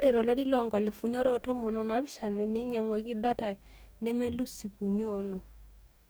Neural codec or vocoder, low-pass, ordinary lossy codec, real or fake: codec, 44.1 kHz, 2.6 kbps, DAC; none; none; fake